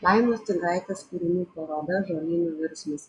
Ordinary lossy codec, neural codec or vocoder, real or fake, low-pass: MP3, 64 kbps; none; real; 10.8 kHz